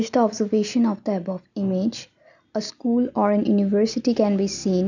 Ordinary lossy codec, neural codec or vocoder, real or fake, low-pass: none; none; real; 7.2 kHz